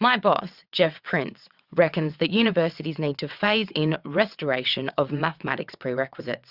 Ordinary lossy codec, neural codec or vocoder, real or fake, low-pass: Opus, 64 kbps; vocoder, 22.05 kHz, 80 mel bands, WaveNeXt; fake; 5.4 kHz